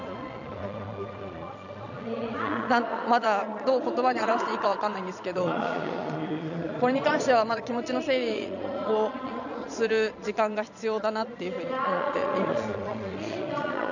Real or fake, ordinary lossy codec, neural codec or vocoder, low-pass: fake; none; vocoder, 22.05 kHz, 80 mel bands, Vocos; 7.2 kHz